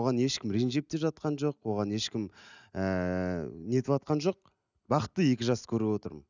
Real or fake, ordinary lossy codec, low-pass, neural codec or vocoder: real; none; 7.2 kHz; none